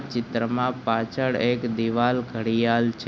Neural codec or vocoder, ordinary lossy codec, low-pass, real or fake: none; none; none; real